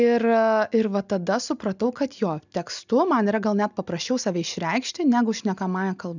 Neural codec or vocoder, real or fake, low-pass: none; real; 7.2 kHz